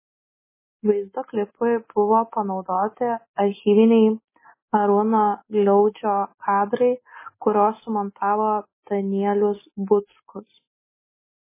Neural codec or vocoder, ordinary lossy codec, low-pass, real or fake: none; MP3, 16 kbps; 3.6 kHz; real